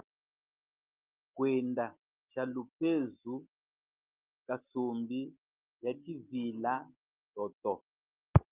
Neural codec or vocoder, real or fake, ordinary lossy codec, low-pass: none; real; Opus, 32 kbps; 3.6 kHz